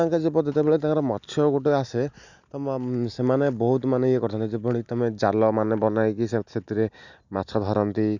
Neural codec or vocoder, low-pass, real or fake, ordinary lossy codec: none; 7.2 kHz; real; none